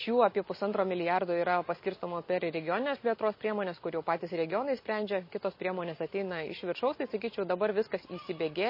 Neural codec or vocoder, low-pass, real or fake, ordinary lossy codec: none; 5.4 kHz; real; MP3, 24 kbps